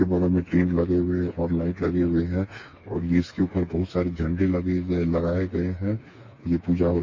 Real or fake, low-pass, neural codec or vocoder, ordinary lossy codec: fake; 7.2 kHz; codec, 16 kHz, 4 kbps, FreqCodec, smaller model; MP3, 32 kbps